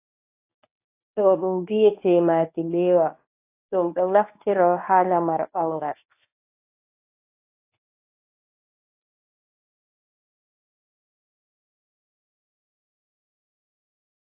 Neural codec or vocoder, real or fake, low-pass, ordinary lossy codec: codec, 24 kHz, 0.9 kbps, WavTokenizer, medium speech release version 1; fake; 3.6 kHz; AAC, 24 kbps